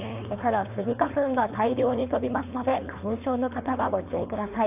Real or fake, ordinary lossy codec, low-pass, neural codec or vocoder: fake; AAC, 32 kbps; 3.6 kHz; codec, 16 kHz, 4.8 kbps, FACodec